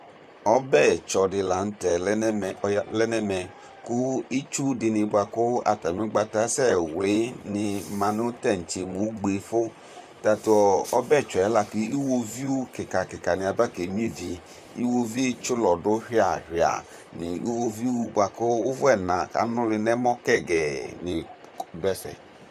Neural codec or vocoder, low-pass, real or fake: vocoder, 44.1 kHz, 128 mel bands, Pupu-Vocoder; 14.4 kHz; fake